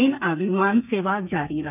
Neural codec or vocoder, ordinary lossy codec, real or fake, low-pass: codec, 32 kHz, 1.9 kbps, SNAC; none; fake; 3.6 kHz